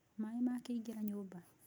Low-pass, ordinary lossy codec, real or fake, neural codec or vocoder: none; none; real; none